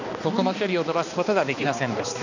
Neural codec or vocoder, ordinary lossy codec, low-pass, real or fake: codec, 16 kHz, 2 kbps, X-Codec, HuBERT features, trained on balanced general audio; none; 7.2 kHz; fake